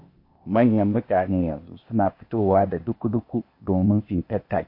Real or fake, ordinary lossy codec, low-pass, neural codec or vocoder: fake; MP3, 32 kbps; 5.4 kHz; codec, 16 kHz, 0.8 kbps, ZipCodec